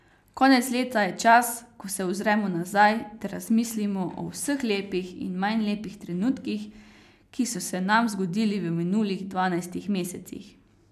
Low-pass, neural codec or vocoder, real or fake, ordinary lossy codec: 14.4 kHz; none; real; none